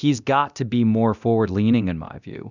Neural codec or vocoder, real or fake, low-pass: codec, 24 kHz, 0.9 kbps, DualCodec; fake; 7.2 kHz